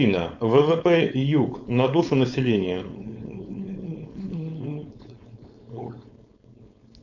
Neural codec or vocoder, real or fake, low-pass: codec, 16 kHz, 4.8 kbps, FACodec; fake; 7.2 kHz